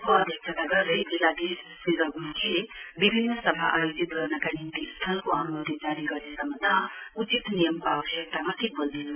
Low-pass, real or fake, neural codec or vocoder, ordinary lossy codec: 3.6 kHz; real; none; none